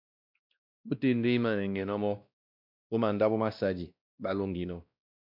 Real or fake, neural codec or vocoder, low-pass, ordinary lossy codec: fake; codec, 16 kHz, 1 kbps, X-Codec, WavLM features, trained on Multilingual LibriSpeech; 5.4 kHz; MP3, 48 kbps